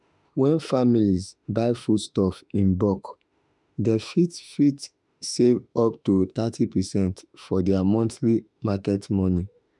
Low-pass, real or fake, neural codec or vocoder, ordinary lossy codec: 10.8 kHz; fake; autoencoder, 48 kHz, 32 numbers a frame, DAC-VAE, trained on Japanese speech; none